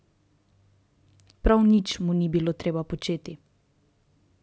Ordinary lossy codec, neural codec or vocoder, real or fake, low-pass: none; none; real; none